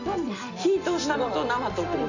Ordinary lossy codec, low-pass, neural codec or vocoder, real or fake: none; 7.2 kHz; none; real